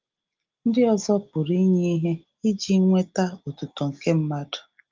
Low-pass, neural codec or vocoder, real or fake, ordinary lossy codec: 7.2 kHz; none; real; Opus, 24 kbps